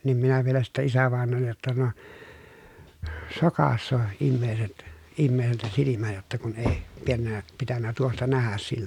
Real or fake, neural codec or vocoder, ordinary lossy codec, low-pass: real; none; none; 19.8 kHz